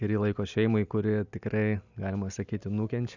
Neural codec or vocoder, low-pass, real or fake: none; 7.2 kHz; real